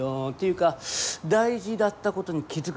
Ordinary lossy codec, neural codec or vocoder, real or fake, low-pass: none; none; real; none